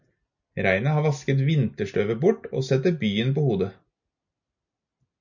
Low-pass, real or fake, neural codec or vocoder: 7.2 kHz; real; none